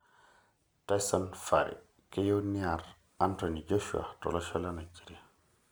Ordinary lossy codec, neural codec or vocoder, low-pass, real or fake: none; none; none; real